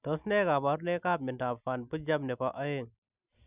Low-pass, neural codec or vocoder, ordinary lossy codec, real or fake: 3.6 kHz; none; none; real